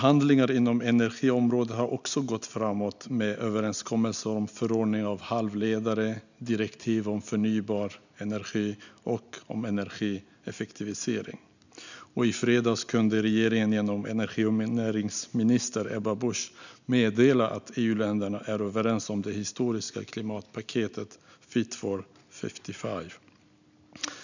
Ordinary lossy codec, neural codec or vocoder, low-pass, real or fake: none; none; 7.2 kHz; real